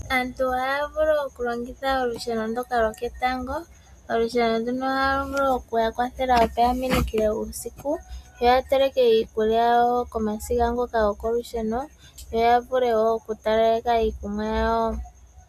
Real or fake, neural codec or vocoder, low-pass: real; none; 14.4 kHz